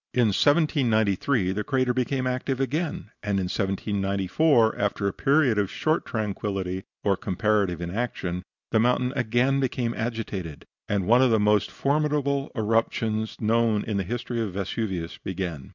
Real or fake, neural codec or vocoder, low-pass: real; none; 7.2 kHz